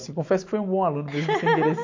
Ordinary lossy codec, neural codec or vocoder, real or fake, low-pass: MP3, 48 kbps; none; real; 7.2 kHz